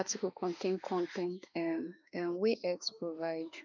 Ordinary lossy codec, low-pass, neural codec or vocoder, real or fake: none; 7.2 kHz; autoencoder, 48 kHz, 32 numbers a frame, DAC-VAE, trained on Japanese speech; fake